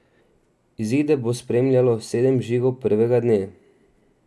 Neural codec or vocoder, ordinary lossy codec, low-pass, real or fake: none; none; none; real